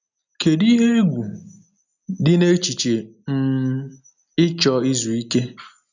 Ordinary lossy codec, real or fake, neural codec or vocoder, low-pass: none; real; none; 7.2 kHz